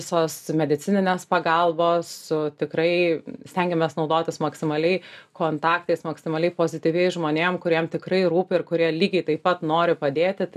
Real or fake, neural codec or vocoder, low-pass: real; none; 14.4 kHz